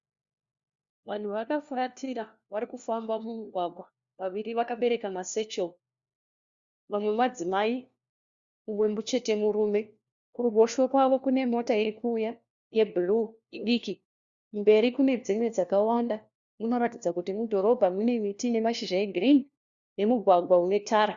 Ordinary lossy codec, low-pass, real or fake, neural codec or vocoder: Opus, 64 kbps; 7.2 kHz; fake; codec, 16 kHz, 1 kbps, FunCodec, trained on LibriTTS, 50 frames a second